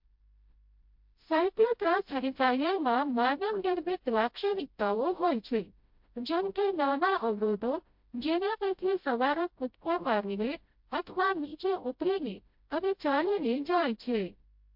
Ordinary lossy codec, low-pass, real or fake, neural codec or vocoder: MP3, 48 kbps; 5.4 kHz; fake; codec, 16 kHz, 0.5 kbps, FreqCodec, smaller model